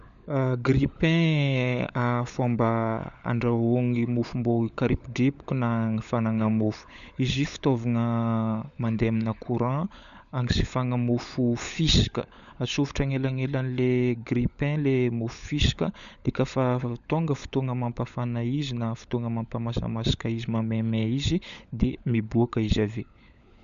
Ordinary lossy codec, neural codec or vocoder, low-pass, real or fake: none; codec, 16 kHz, 16 kbps, FunCodec, trained on LibriTTS, 50 frames a second; 7.2 kHz; fake